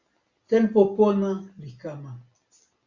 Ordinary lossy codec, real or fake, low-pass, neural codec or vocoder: Opus, 64 kbps; real; 7.2 kHz; none